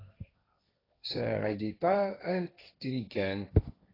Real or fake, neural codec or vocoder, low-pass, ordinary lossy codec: fake; codec, 16 kHz, 1.1 kbps, Voila-Tokenizer; 5.4 kHz; AAC, 24 kbps